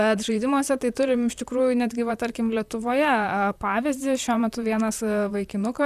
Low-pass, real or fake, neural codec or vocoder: 14.4 kHz; fake; vocoder, 44.1 kHz, 128 mel bands, Pupu-Vocoder